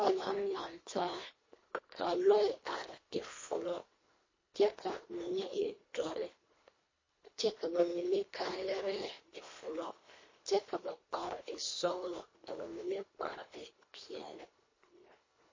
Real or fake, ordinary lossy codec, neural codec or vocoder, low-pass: fake; MP3, 32 kbps; codec, 24 kHz, 1.5 kbps, HILCodec; 7.2 kHz